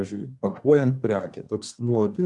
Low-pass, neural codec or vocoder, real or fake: 10.8 kHz; codec, 24 kHz, 1 kbps, SNAC; fake